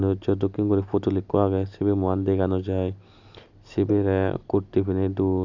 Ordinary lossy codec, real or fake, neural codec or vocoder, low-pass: none; real; none; 7.2 kHz